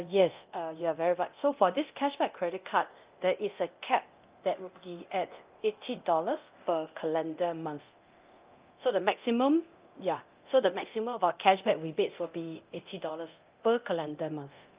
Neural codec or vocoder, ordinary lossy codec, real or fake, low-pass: codec, 24 kHz, 0.9 kbps, DualCodec; Opus, 24 kbps; fake; 3.6 kHz